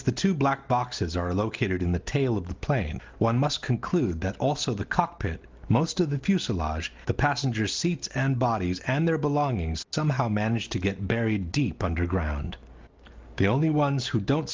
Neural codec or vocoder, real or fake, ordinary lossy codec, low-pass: none; real; Opus, 32 kbps; 7.2 kHz